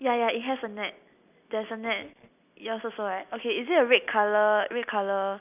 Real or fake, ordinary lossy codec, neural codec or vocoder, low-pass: real; none; none; 3.6 kHz